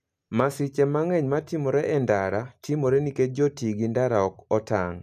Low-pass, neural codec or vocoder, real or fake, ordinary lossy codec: 14.4 kHz; none; real; none